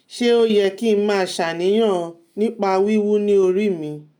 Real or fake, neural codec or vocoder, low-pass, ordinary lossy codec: real; none; 19.8 kHz; none